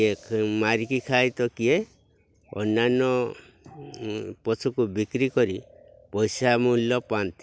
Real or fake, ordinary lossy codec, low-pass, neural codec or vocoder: real; none; none; none